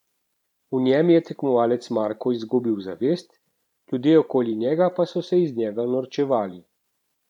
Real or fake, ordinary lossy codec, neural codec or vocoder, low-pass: fake; none; vocoder, 44.1 kHz, 128 mel bands every 512 samples, BigVGAN v2; 19.8 kHz